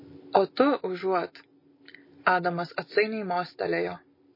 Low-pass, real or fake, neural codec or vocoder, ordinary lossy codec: 5.4 kHz; real; none; MP3, 24 kbps